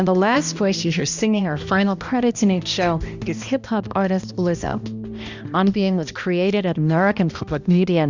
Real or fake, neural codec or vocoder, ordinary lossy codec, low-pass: fake; codec, 16 kHz, 1 kbps, X-Codec, HuBERT features, trained on balanced general audio; Opus, 64 kbps; 7.2 kHz